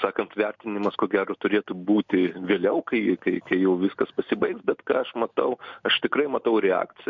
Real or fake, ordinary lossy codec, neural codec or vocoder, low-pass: real; MP3, 64 kbps; none; 7.2 kHz